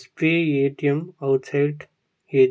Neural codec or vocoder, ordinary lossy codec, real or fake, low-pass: none; none; real; none